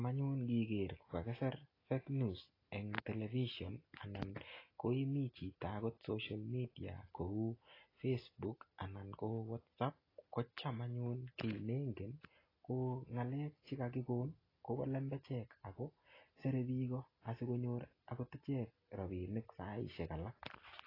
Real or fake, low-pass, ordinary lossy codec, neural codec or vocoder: real; 5.4 kHz; AAC, 24 kbps; none